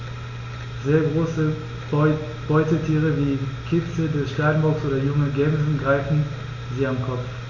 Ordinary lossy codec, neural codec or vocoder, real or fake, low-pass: none; none; real; 7.2 kHz